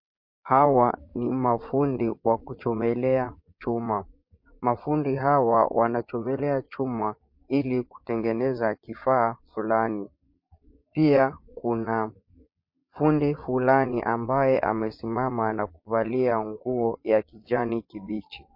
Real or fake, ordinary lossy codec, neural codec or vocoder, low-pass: fake; MP3, 32 kbps; vocoder, 22.05 kHz, 80 mel bands, Vocos; 5.4 kHz